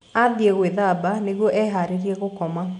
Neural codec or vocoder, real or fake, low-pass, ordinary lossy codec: none; real; 10.8 kHz; none